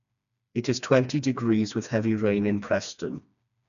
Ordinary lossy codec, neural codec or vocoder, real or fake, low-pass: none; codec, 16 kHz, 2 kbps, FreqCodec, smaller model; fake; 7.2 kHz